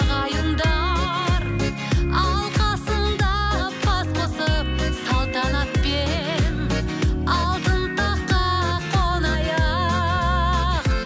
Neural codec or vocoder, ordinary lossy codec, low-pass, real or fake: none; none; none; real